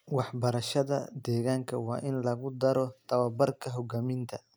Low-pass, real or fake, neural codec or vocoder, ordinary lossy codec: none; real; none; none